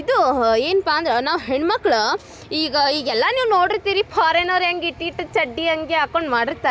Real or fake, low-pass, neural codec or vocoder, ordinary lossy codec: real; none; none; none